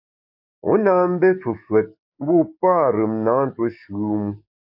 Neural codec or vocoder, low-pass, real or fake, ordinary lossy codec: codec, 44.1 kHz, 7.8 kbps, DAC; 5.4 kHz; fake; AAC, 48 kbps